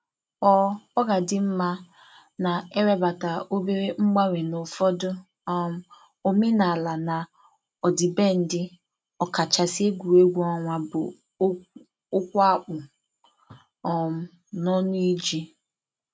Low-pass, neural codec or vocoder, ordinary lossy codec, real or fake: none; none; none; real